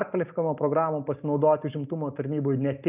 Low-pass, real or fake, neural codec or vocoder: 3.6 kHz; real; none